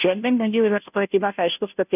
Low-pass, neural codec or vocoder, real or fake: 3.6 kHz; codec, 16 kHz, 0.5 kbps, FunCodec, trained on Chinese and English, 25 frames a second; fake